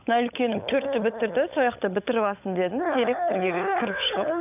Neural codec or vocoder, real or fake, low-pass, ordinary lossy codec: codec, 16 kHz, 16 kbps, FunCodec, trained on LibriTTS, 50 frames a second; fake; 3.6 kHz; none